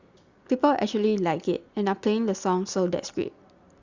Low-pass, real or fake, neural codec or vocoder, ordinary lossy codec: 7.2 kHz; real; none; Opus, 64 kbps